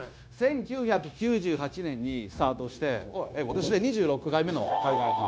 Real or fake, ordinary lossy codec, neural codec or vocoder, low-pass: fake; none; codec, 16 kHz, 0.9 kbps, LongCat-Audio-Codec; none